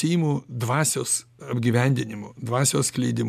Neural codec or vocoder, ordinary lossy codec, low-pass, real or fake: none; MP3, 96 kbps; 14.4 kHz; real